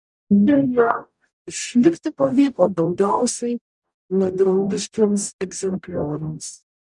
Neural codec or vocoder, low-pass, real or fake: codec, 44.1 kHz, 0.9 kbps, DAC; 10.8 kHz; fake